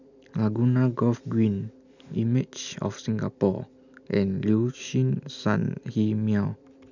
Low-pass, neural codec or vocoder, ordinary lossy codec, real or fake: 7.2 kHz; none; none; real